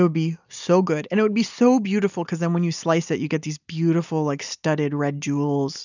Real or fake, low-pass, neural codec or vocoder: real; 7.2 kHz; none